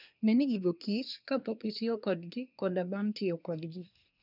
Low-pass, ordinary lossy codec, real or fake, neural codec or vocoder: 5.4 kHz; none; fake; codec, 24 kHz, 1 kbps, SNAC